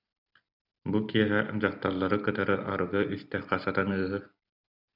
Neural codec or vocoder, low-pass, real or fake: none; 5.4 kHz; real